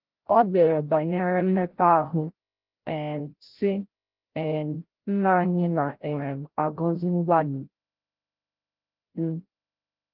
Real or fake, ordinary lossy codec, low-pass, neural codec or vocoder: fake; Opus, 16 kbps; 5.4 kHz; codec, 16 kHz, 0.5 kbps, FreqCodec, larger model